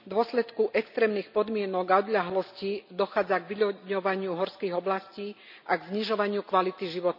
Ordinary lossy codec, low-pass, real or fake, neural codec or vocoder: none; 5.4 kHz; real; none